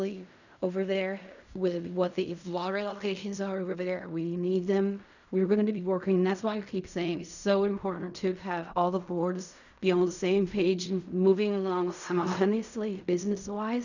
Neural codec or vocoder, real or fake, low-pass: codec, 16 kHz in and 24 kHz out, 0.4 kbps, LongCat-Audio-Codec, fine tuned four codebook decoder; fake; 7.2 kHz